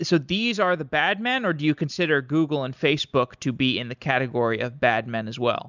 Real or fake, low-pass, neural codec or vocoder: real; 7.2 kHz; none